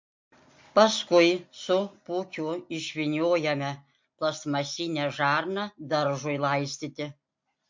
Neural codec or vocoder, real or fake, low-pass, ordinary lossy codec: none; real; 7.2 kHz; MP3, 48 kbps